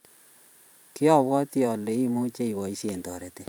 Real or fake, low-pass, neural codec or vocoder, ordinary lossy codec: fake; none; vocoder, 44.1 kHz, 128 mel bands every 512 samples, BigVGAN v2; none